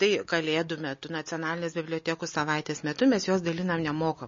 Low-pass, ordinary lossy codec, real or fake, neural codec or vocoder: 7.2 kHz; MP3, 32 kbps; real; none